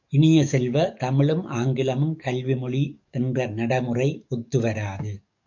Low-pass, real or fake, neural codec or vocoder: 7.2 kHz; fake; codec, 44.1 kHz, 7.8 kbps, DAC